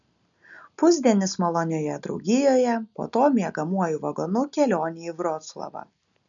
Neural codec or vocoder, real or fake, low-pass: none; real; 7.2 kHz